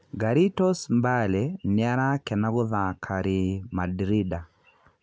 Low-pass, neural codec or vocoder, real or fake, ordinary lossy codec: none; none; real; none